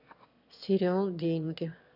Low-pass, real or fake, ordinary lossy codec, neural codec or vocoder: 5.4 kHz; fake; none; autoencoder, 22.05 kHz, a latent of 192 numbers a frame, VITS, trained on one speaker